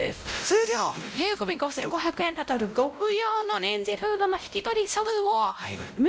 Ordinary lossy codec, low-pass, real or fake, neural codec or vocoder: none; none; fake; codec, 16 kHz, 0.5 kbps, X-Codec, WavLM features, trained on Multilingual LibriSpeech